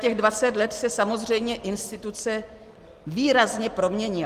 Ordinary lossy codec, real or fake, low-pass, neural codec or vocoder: Opus, 24 kbps; real; 14.4 kHz; none